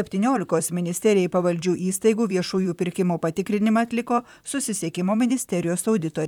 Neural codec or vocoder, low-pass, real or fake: vocoder, 44.1 kHz, 128 mel bands, Pupu-Vocoder; 19.8 kHz; fake